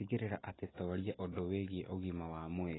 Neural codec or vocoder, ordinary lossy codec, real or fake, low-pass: none; AAC, 16 kbps; real; 7.2 kHz